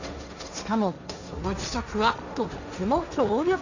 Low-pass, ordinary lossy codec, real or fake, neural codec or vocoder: 7.2 kHz; none; fake; codec, 16 kHz, 1.1 kbps, Voila-Tokenizer